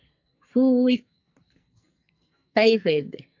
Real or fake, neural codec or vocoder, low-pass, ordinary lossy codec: fake; codec, 44.1 kHz, 2.6 kbps, SNAC; 7.2 kHz; AAC, 48 kbps